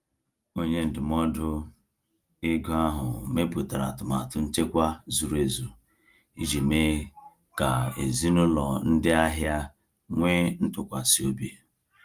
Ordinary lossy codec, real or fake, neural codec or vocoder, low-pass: Opus, 32 kbps; real; none; 14.4 kHz